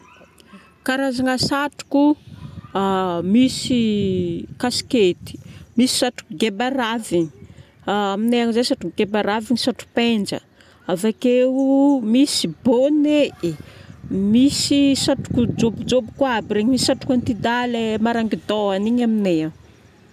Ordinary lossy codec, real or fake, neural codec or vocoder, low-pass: none; real; none; 14.4 kHz